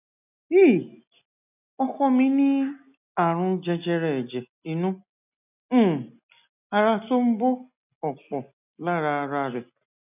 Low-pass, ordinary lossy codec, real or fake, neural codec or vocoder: 3.6 kHz; none; real; none